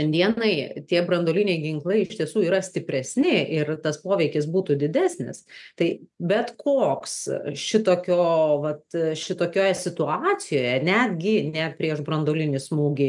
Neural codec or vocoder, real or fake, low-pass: none; real; 10.8 kHz